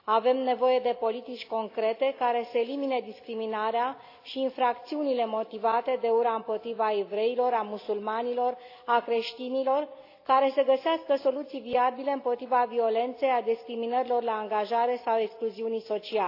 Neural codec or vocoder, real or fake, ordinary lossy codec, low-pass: none; real; AAC, 32 kbps; 5.4 kHz